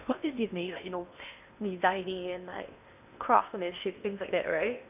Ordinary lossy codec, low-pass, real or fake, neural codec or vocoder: none; 3.6 kHz; fake; codec, 16 kHz in and 24 kHz out, 0.8 kbps, FocalCodec, streaming, 65536 codes